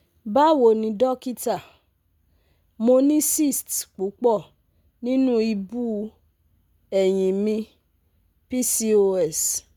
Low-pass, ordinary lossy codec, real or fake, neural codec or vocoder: none; none; real; none